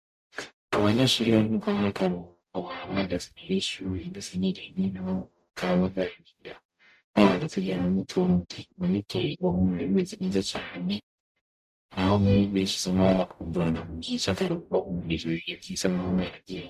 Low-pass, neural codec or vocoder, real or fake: 14.4 kHz; codec, 44.1 kHz, 0.9 kbps, DAC; fake